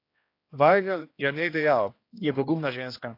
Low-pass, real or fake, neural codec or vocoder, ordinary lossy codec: 5.4 kHz; fake; codec, 16 kHz, 1 kbps, X-Codec, HuBERT features, trained on general audio; AAC, 32 kbps